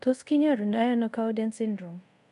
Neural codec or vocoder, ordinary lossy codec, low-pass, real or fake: codec, 24 kHz, 0.5 kbps, DualCodec; AAC, 64 kbps; 10.8 kHz; fake